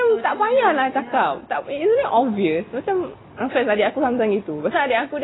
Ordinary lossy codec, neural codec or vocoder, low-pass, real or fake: AAC, 16 kbps; none; 7.2 kHz; real